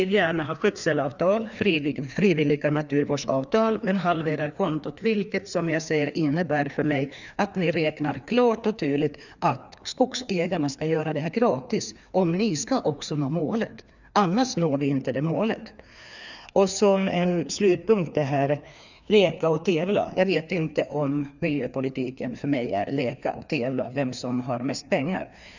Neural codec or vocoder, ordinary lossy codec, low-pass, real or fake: codec, 16 kHz, 2 kbps, FreqCodec, larger model; none; 7.2 kHz; fake